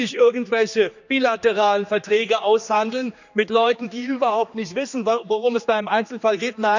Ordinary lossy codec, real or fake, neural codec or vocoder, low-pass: none; fake; codec, 16 kHz, 2 kbps, X-Codec, HuBERT features, trained on general audio; 7.2 kHz